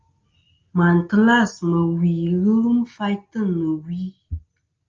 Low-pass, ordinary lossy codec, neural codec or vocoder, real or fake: 7.2 kHz; Opus, 24 kbps; none; real